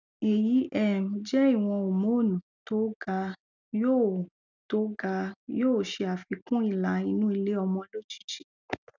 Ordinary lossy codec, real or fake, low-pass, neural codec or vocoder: none; real; 7.2 kHz; none